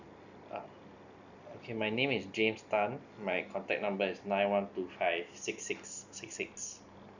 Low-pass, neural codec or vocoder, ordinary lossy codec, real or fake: 7.2 kHz; none; none; real